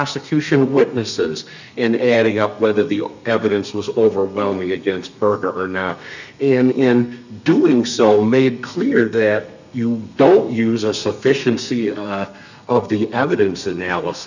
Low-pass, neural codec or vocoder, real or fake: 7.2 kHz; codec, 32 kHz, 1.9 kbps, SNAC; fake